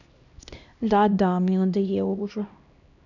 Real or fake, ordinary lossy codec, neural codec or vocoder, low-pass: fake; none; codec, 16 kHz, 1 kbps, X-Codec, HuBERT features, trained on LibriSpeech; 7.2 kHz